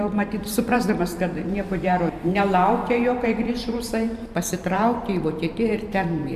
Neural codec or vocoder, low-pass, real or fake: vocoder, 44.1 kHz, 128 mel bands every 512 samples, BigVGAN v2; 14.4 kHz; fake